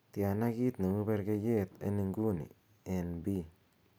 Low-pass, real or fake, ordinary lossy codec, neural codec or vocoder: none; real; none; none